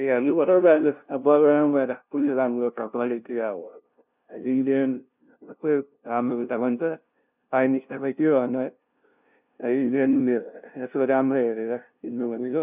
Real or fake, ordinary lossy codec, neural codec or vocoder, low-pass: fake; none; codec, 16 kHz, 0.5 kbps, FunCodec, trained on LibriTTS, 25 frames a second; 3.6 kHz